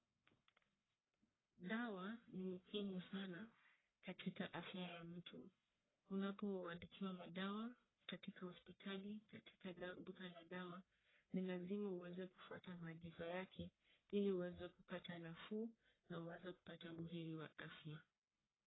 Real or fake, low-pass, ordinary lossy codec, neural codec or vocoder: fake; 7.2 kHz; AAC, 16 kbps; codec, 44.1 kHz, 1.7 kbps, Pupu-Codec